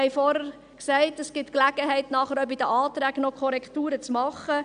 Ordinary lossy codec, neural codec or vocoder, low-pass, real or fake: none; none; 9.9 kHz; real